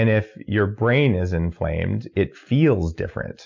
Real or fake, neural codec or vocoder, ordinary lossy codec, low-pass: real; none; MP3, 64 kbps; 7.2 kHz